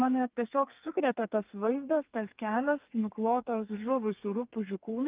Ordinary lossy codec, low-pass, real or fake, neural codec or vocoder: Opus, 24 kbps; 3.6 kHz; fake; codec, 32 kHz, 1.9 kbps, SNAC